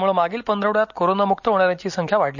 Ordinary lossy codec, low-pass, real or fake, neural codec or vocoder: none; 7.2 kHz; real; none